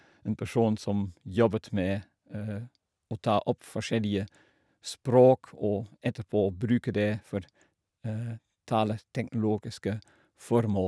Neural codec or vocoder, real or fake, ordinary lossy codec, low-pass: none; real; none; none